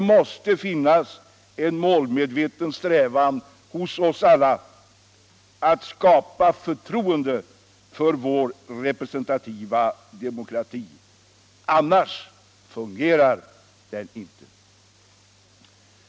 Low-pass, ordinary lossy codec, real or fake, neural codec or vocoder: none; none; real; none